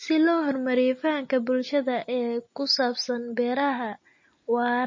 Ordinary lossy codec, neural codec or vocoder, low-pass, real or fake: MP3, 32 kbps; none; 7.2 kHz; real